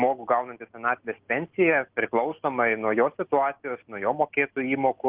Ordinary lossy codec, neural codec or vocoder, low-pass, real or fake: Opus, 16 kbps; none; 3.6 kHz; real